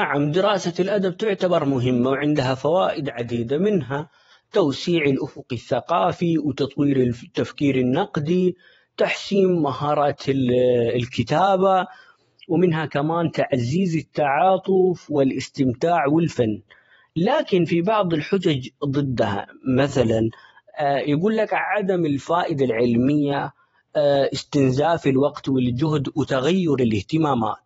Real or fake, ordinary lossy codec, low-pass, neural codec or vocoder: fake; AAC, 24 kbps; 19.8 kHz; vocoder, 48 kHz, 128 mel bands, Vocos